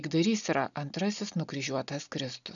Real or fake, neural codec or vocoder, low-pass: real; none; 7.2 kHz